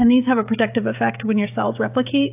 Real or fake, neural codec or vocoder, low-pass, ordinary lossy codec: fake; codec, 16 kHz, 16 kbps, FreqCodec, smaller model; 3.6 kHz; AAC, 32 kbps